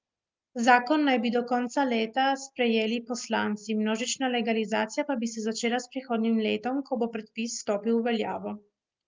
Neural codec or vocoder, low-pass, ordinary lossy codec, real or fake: none; 7.2 kHz; Opus, 24 kbps; real